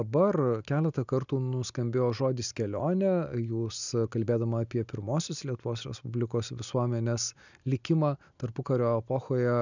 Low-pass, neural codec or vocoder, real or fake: 7.2 kHz; none; real